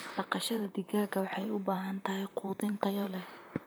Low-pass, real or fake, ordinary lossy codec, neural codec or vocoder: none; fake; none; vocoder, 44.1 kHz, 128 mel bands, Pupu-Vocoder